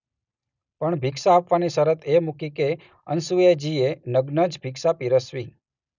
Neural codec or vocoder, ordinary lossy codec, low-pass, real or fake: none; none; 7.2 kHz; real